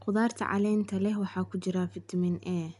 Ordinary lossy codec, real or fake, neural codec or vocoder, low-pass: none; real; none; 10.8 kHz